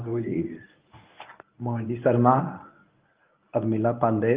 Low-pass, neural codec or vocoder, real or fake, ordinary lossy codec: 3.6 kHz; codec, 24 kHz, 0.9 kbps, WavTokenizer, medium speech release version 1; fake; Opus, 32 kbps